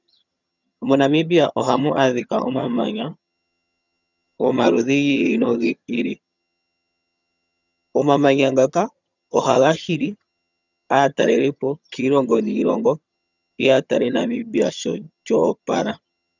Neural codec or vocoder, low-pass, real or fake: vocoder, 22.05 kHz, 80 mel bands, HiFi-GAN; 7.2 kHz; fake